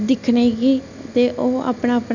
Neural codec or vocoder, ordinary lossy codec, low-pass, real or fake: none; none; 7.2 kHz; real